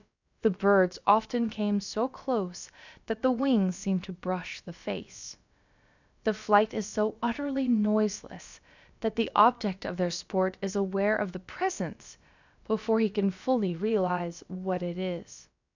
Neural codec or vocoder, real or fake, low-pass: codec, 16 kHz, about 1 kbps, DyCAST, with the encoder's durations; fake; 7.2 kHz